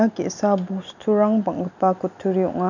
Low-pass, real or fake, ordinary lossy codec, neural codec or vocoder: 7.2 kHz; real; none; none